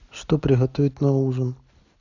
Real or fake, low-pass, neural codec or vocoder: real; 7.2 kHz; none